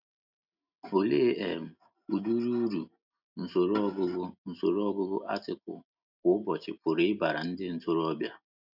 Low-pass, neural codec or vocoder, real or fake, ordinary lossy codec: 5.4 kHz; none; real; none